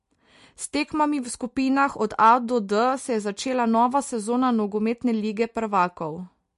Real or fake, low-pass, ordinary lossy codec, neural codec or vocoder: real; 14.4 kHz; MP3, 48 kbps; none